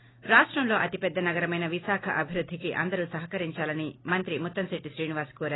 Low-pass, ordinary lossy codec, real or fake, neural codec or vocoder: 7.2 kHz; AAC, 16 kbps; real; none